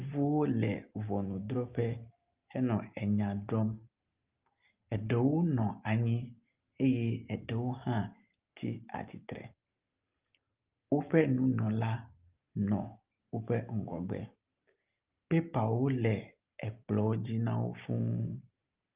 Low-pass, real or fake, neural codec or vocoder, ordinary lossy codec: 3.6 kHz; real; none; Opus, 32 kbps